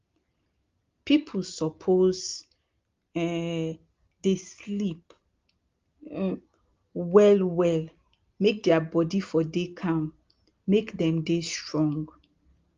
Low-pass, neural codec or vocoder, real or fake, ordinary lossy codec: 7.2 kHz; none; real; Opus, 32 kbps